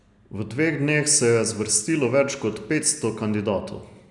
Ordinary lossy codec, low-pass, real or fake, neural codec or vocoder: none; 10.8 kHz; real; none